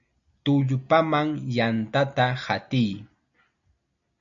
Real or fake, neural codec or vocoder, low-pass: real; none; 7.2 kHz